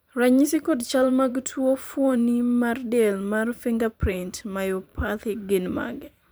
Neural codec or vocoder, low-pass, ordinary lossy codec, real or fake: vocoder, 44.1 kHz, 128 mel bands every 512 samples, BigVGAN v2; none; none; fake